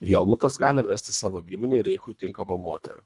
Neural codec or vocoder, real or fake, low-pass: codec, 24 kHz, 1.5 kbps, HILCodec; fake; 10.8 kHz